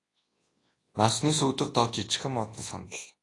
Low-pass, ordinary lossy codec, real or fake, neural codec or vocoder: 10.8 kHz; AAC, 32 kbps; fake; codec, 24 kHz, 0.9 kbps, WavTokenizer, large speech release